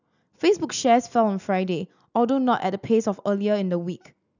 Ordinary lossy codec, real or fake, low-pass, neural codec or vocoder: none; real; 7.2 kHz; none